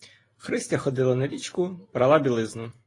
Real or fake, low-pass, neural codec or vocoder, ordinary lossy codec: real; 10.8 kHz; none; AAC, 32 kbps